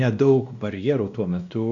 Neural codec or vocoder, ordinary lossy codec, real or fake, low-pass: codec, 16 kHz, 1 kbps, X-Codec, WavLM features, trained on Multilingual LibriSpeech; MP3, 96 kbps; fake; 7.2 kHz